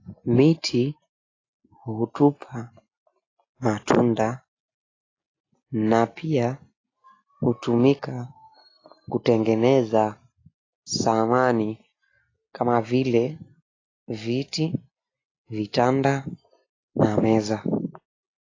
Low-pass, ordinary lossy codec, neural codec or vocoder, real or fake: 7.2 kHz; AAC, 32 kbps; none; real